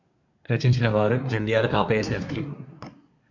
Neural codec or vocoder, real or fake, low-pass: codec, 24 kHz, 1 kbps, SNAC; fake; 7.2 kHz